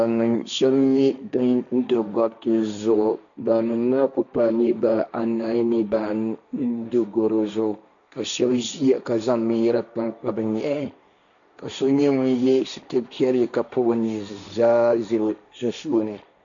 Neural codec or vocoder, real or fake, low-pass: codec, 16 kHz, 1.1 kbps, Voila-Tokenizer; fake; 7.2 kHz